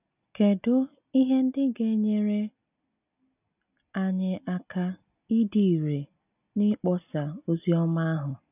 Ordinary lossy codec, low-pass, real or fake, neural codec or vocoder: none; 3.6 kHz; real; none